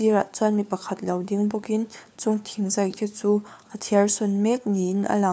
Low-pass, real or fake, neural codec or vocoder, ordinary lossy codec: none; fake; codec, 16 kHz, 4 kbps, FunCodec, trained on LibriTTS, 50 frames a second; none